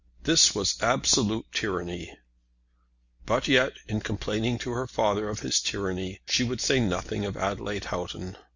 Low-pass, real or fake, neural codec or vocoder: 7.2 kHz; real; none